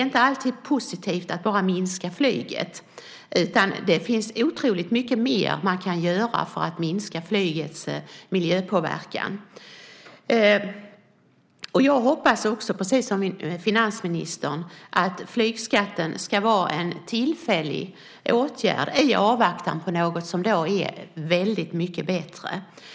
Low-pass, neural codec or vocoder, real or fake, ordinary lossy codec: none; none; real; none